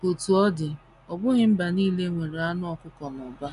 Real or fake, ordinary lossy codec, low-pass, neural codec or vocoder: real; none; 10.8 kHz; none